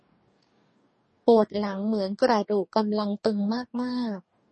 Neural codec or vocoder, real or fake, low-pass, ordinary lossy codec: codec, 44.1 kHz, 2.6 kbps, DAC; fake; 10.8 kHz; MP3, 32 kbps